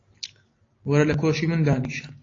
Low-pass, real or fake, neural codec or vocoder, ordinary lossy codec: 7.2 kHz; real; none; AAC, 32 kbps